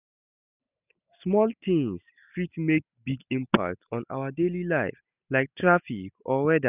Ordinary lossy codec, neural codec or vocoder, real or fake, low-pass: none; none; real; 3.6 kHz